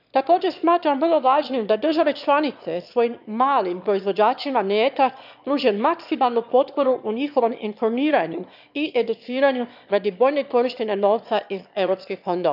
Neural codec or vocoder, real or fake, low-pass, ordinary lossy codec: autoencoder, 22.05 kHz, a latent of 192 numbers a frame, VITS, trained on one speaker; fake; 5.4 kHz; none